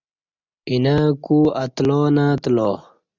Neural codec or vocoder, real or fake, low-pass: none; real; 7.2 kHz